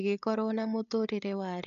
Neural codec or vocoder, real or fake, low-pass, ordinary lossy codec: codec, 16 kHz, 8 kbps, FreqCodec, larger model; fake; 7.2 kHz; none